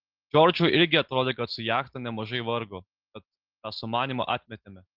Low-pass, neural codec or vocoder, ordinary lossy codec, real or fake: 5.4 kHz; none; Opus, 16 kbps; real